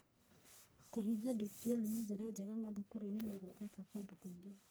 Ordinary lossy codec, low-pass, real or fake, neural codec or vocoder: none; none; fake; codec, 44.1 kHz, 1.7 kbps, Pupu-Codec